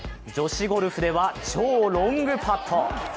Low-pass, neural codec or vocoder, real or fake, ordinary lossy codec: none; none; real; none